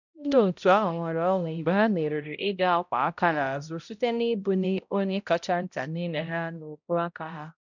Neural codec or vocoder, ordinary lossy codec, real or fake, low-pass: codec, 16 kHz, 0.5 kbps, X-Codec, HuBERT features, trained on balanced general audio; none; fake; 7.2 kHz